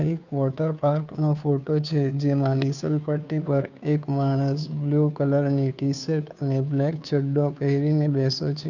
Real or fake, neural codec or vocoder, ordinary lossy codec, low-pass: fake; codec, 16 kHz, 2 kbps, FunCodec, trained on Chinese and English, 25 frames a second; none; 7.2 kHz